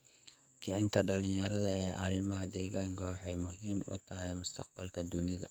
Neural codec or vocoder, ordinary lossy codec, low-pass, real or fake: codec, 44.1 kHz, 2.6 kbps, SNAC; none; none; fake